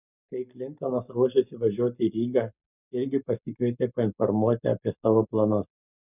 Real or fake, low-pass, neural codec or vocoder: fake; 3.6 kHz; codec, 44.1 kHz, 7.8 kbps, Pupu-Codec